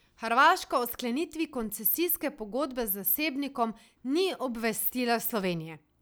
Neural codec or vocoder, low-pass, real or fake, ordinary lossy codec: none; none; real; none